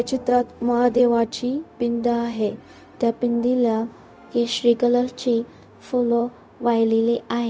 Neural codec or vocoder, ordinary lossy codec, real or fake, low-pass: codec, 16 kHz, 0.4 kbps, LongCat-Audio-Codec; none; fake; none